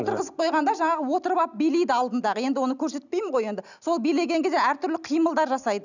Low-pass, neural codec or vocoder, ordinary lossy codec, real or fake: 7.2 kHz; none; none; real